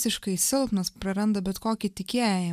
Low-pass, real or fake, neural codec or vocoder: 14.4 kHz; real; none